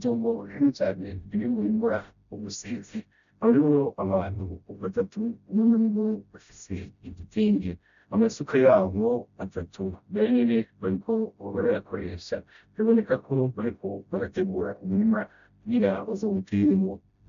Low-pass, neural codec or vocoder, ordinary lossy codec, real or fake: 7.2 kHz; codec, 16 kHz, 0.5 kbps, FreqCodec, smaller model; MP3, 64 kbps; fake